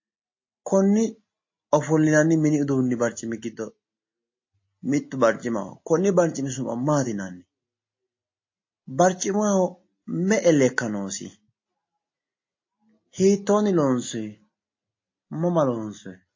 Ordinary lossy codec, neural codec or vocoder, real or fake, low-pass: MP3, 32 kbps; none; real; 7.2 kHz